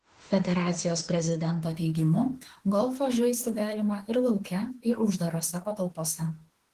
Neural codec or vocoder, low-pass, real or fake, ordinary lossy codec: autoencoder, 48 kHz, 32 numbers a frame, DAC-VAE, trained on Japanese speech; 14.4 kHz; fake; Opus, 16 kbps